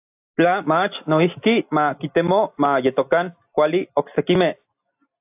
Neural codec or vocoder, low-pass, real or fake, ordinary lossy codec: none; 3.6 kHz; real; AAC, 32 kbps